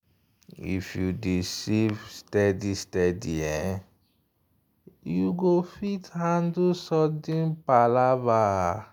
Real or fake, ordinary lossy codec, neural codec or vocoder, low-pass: real; none; none; none